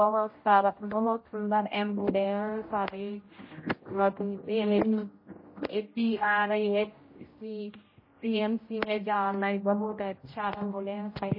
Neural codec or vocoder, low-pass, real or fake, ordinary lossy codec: codec, 16 kHz, 0.5 kbps, X-Codec, HuBERT features, trained on general audio; 5.4 kHz; fake; MP3, 24 kbps